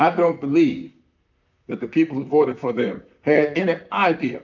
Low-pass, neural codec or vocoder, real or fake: 7.2 kHz; vocoder, 44.1 kHz, 128 mel bands, Pupu-Vocoder; fake